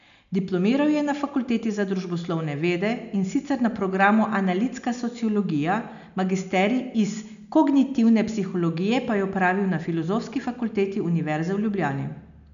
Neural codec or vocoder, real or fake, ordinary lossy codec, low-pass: none; real; none; 7.2 kHz